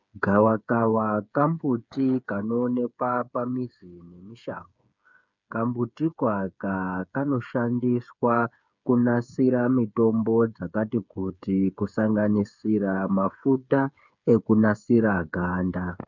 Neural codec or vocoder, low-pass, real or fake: codec, 16 kHz, 8 kbps, FreqCodec, smaller model; 7.2 kHz; fake